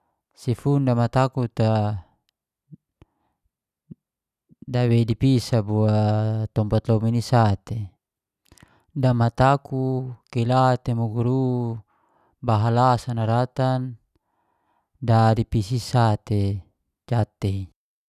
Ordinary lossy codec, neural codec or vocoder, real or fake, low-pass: none; none; real; 14.4 kHz